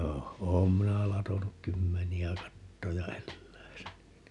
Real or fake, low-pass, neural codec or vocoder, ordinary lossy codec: real; 10.8 kHz; none; none